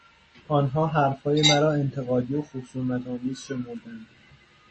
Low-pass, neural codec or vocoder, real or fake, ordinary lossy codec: 10.8 kHz; none; real; MP3, 32 kbps